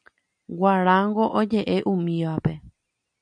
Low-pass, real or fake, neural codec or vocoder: 9.9 kHz; real; none